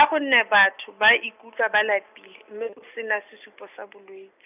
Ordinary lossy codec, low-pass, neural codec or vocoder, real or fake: none; 3.6 kHz; none; real